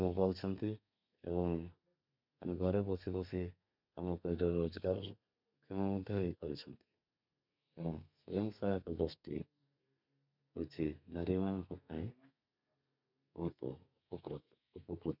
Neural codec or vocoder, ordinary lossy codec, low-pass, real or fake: codec, 32 kHz, 1.9 kbps, SNAC; none; 5.4 kHz; fake